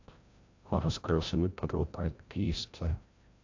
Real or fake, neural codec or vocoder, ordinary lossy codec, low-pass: fake; codec, 16 kHz, 0.5 kbps, FreqCodec, larger model; AAC, 48 kbps; 7.2 kHz